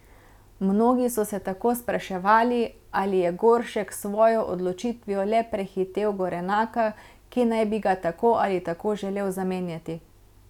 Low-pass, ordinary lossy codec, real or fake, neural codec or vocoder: 19.8 kHz; none; real; none